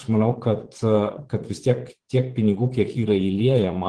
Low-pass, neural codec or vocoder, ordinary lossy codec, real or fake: 9.9 kHz; vocoder, 22.05 kHz, 80 mel bands, Vocos; Opus, 16 kbps; fake